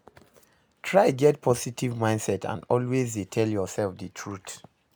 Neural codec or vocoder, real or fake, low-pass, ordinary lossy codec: none; real; none; none